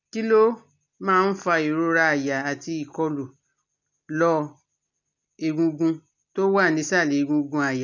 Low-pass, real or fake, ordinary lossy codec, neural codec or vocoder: 7.2 kHz; real; none; none